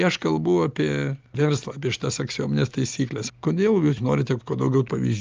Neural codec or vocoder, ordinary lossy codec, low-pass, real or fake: none; Opus, 24 kbps; 7.2 kHz; real